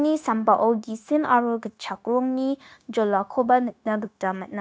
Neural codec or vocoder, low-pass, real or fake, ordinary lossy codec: codec, 16 kHz, 0.9 kbps, LongCat-Audio-Codec; none; fake; none